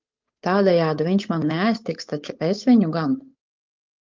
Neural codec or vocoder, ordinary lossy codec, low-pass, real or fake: codec, 16 kHz, 8 kbps, FunCodec, trained on Chinese and English, 25 frames a second; Opus, 24 kbps; 7.2 kHz; fake